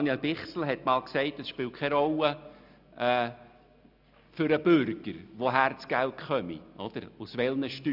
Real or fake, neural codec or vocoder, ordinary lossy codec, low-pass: real; none; none; 5.4 kHz